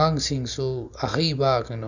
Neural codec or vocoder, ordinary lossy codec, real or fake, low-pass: none; none; real; 7.2 kHz